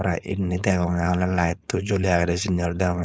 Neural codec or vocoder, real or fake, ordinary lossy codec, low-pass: codec, 16 kHz, 4.8 kbps, FACodec; fake; none; none